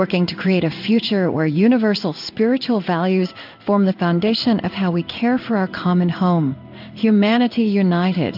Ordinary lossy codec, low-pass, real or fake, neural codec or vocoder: AAC, 48 kbps; 5.4 kHz; fake; codec, 16 kHz in and 24 kHz out, 1 kbps, XY-Tokenizer